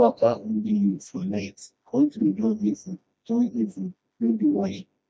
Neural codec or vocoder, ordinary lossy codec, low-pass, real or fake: codec, 16 kHz, 1 kbps, FreqCodec, smaller model; none; none; fake